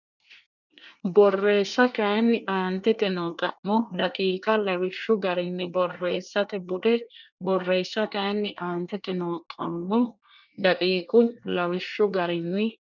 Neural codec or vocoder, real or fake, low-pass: codec, 24 kHz, 1 kbps, SNAC; fake; 7.2 kHz